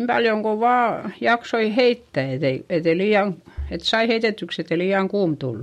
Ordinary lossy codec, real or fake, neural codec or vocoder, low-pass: MP3, 64 kbps; real; none; 19.8 kHz